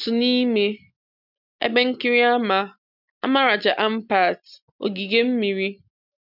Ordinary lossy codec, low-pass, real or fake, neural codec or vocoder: none; 5.4 kHz; real; none